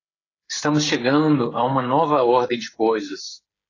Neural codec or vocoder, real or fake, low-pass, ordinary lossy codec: codec, 16 kHz, 8 kbps, FreqCodec, smaller model; fake; 7.2 kHz; AAC, 32 kbps